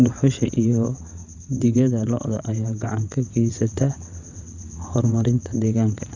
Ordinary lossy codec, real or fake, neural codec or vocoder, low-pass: none; fake; vocoder, 22.05 kHz, 80 mel bands, WaveNeXt; 7.2 kHz